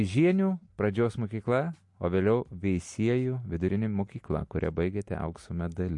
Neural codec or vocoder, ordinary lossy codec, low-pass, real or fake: none; MP3, 48 kbps; 10.8 kHz; real